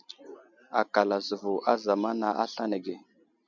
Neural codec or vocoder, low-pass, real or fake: none; 7.2 kHz; real